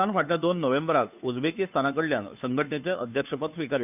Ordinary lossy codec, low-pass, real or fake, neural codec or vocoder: none; 3.6 kHz; fake; codec, 16 kHz, 2 kbps, FunCodec, trained on Chinese and English, 25 frames a second